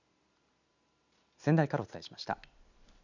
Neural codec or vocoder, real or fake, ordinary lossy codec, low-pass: none; real; none; 7.2 kHz